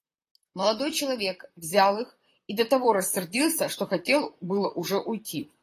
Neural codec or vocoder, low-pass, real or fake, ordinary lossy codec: vocoder, 44.1 kHz, 128 mel bands, Pupu-Vocoder; 14.4 kHz; fake; AAC, 48 kbps